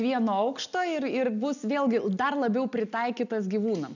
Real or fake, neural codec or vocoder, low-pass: real; none; 7.2 kHz